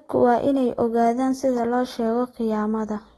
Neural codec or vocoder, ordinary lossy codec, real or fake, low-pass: none; AAC, 32 kbps; real; 19.8 kHz